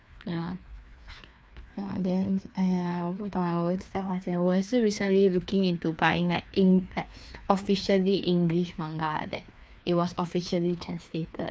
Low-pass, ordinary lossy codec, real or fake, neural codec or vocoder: none; none; fake; codec, 16 kHz, 2 kbps, FreqCodec, larger model